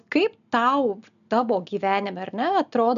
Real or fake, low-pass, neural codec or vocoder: real; 7.2 kHz; none